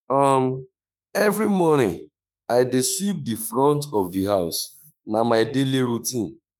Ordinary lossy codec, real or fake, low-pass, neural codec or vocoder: none; fake; none; autoencoder, 48 kHz, 32 numbers a frame, DAC-VAE, trained on Japanese speech